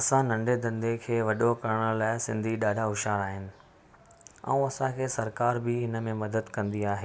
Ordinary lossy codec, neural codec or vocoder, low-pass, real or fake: none; none; none; real